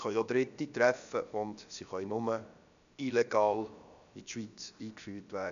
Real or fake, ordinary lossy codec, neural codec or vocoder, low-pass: fake; none; codec, 16 kHz, about 1 kbps, DyCAST, with the encoder's durations; 7.2 kHz